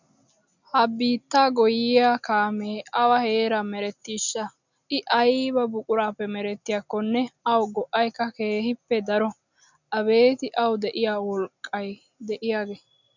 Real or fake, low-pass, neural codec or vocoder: real; 7.2 kHz; none